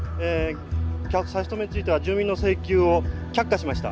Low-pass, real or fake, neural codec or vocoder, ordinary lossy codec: none; real; none; none